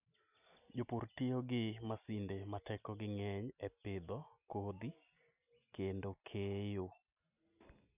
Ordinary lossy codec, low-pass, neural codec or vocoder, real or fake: none; 3.6 kHz; none; real